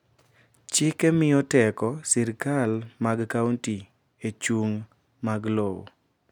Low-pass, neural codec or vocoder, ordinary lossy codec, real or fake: 19.8 kHz; none; none; real